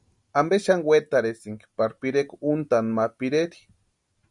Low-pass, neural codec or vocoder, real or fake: 10.8 kHz; none; real